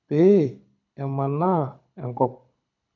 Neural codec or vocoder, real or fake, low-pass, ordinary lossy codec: codec, 24 kHz, 6 kbps, HILCodec; fake; 7.2 kHz; none